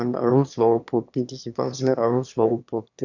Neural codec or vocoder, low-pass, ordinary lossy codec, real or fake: autoencoder, 22.05 kHz, a latent of 192 numbers a frame, VITS, trained on one speaker; 7.2 kHz; none; fake